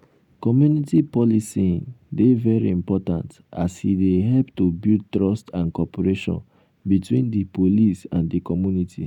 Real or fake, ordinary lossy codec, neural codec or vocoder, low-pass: real; none; none; 19.8 kHz